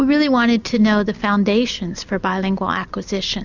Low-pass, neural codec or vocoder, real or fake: 7.2 kHz; none; real